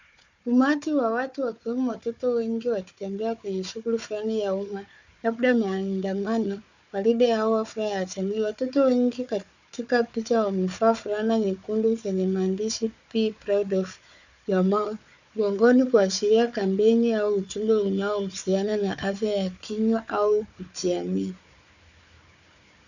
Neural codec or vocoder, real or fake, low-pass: codec, 16 kHz, 4 kbps, FunCodec, trained on Chinese and English, 50 frames a second; fake; 7.2 kHz